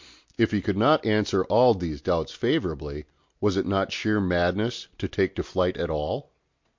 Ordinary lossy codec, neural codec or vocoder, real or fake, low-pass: MP3, 48 kbps; none; real; 7.2 kHz